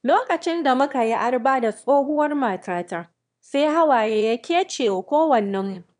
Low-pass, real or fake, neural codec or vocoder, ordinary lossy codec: 9.9 kHz; fake; autoencoder, 22.05 kHz, a latent of 192 numbers a frame, VITS, trained on one speaker; none